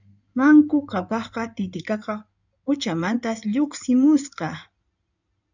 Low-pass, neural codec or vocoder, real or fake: 7.2 kHz; codec, 16 kHz in and 24 kHz out, 2.2 kbps, FireRedTTS-2 codec; fake